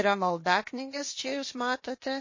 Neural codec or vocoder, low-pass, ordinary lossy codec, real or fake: codec, 16 kHz, 0.8 kbps, ZipCodec; 7.2 kHz; MP3, 32 kbps; fake